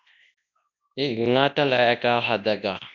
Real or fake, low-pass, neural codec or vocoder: fake; 7.2 kHz; codec, 24 kHz, 0.9 kbps, WavTokenizer, large speech release